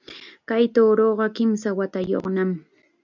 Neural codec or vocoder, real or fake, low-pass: none; real; 7.2 kHz